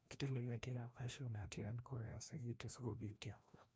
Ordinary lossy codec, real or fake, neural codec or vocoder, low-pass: none; fake; codec, 16 kHz, 1 kbps, FreqCodec, larger model; none